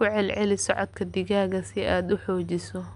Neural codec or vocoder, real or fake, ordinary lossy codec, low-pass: none; real; none; 10.8 kHz